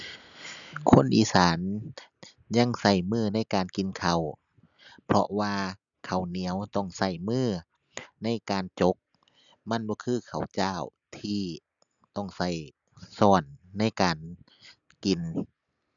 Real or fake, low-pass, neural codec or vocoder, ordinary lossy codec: real; 7.2 kHz; none; none